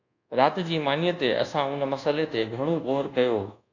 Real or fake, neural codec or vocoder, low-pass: fake; codec, 24 kHz, 1.2 kbps, DualCodec; 7.2 kHz